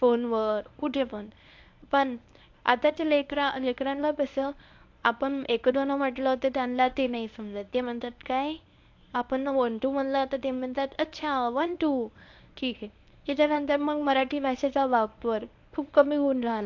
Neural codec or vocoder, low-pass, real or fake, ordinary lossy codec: codec, 24 kHz, 0.9 kbps, WavTokenizer, medium speech release version 1; 7.2 kHz; fake; AAC, 48 kbps